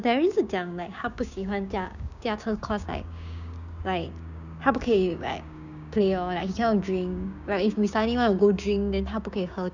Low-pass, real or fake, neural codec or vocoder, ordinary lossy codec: 7.2 kHz; fake; codec, 16 kHz, 6 kbps, DAC; none